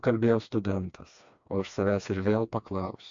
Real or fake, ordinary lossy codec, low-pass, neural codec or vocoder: fake; AAC, 64 kbps; 7.2 kHz; codec, 16 kHz, 2 kbps, FreqCodec, smaller model